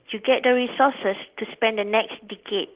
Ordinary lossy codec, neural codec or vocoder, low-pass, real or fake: Opus, 16 kbps; none; 3.6 kHz; real